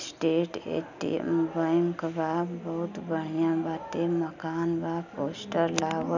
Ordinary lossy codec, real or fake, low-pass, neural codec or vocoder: none; real; 7.2 kHz; none